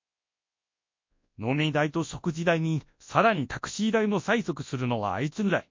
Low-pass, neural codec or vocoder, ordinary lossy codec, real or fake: 7.2 kHz; codec, 24 kHz, 0.9 kbps, WavTokenizer, large speech release; MP3, 48 kbps; fake